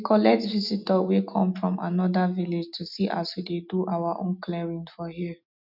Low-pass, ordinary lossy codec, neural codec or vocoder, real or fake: 5.4 kHz; none; none; real